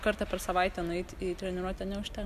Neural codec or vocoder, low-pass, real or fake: none; 14.4 kHz; real